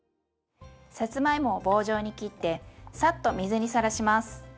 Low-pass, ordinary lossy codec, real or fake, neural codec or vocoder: none; none; real; none